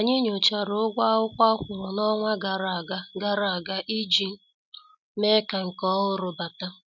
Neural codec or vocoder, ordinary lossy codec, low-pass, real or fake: none; none; 7.2 kHz; real